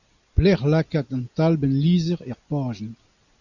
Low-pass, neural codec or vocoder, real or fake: 7.2 kHz; none; real